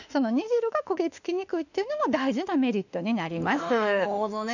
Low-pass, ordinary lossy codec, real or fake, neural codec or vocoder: 7.2 kHz; none; fake; autoencoder, 48 kHz, 32 numbers a frame, DAC-VAE, trained on Japanese speech